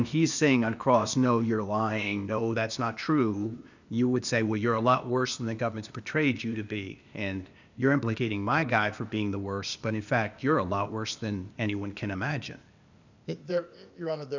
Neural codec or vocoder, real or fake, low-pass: codec, 16 kHz, 0.8 kbps, ZipCodec; fake; 7.2 kHz